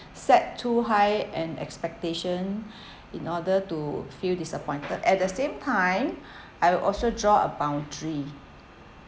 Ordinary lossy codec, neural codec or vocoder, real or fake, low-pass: none; none; real; none